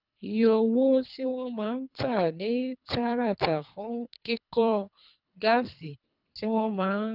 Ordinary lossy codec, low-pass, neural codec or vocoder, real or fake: none; 5.4 kHz; codec, 24 kHz, 3 kbps, HILCodec; fake